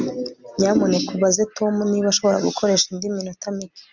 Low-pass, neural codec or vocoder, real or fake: 7.2 kHz; none; real